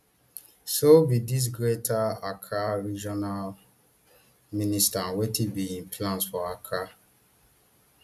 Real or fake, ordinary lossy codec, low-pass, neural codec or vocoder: real; none; 14.4 kHz; none